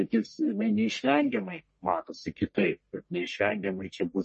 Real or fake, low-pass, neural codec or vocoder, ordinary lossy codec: fake; 10.8 kHz; codec, 44.1 kHz, 1.7 kbps, Pupu-Codec; MP3, 32 kbps